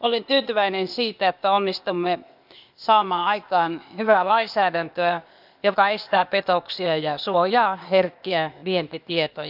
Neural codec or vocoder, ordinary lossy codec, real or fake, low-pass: codec, 16 kHz, 0.8 kbps, ZipCodec; none; fake; 5.4 kHz